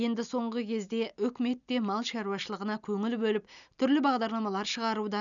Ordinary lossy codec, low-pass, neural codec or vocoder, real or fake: none; 7.2 kHz; none; real